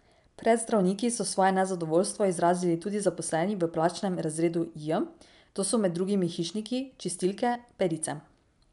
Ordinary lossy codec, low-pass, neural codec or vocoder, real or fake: none; 10.8 kHz; none; real